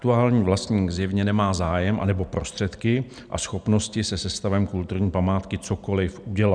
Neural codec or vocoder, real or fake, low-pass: none; real; 9.9 kHz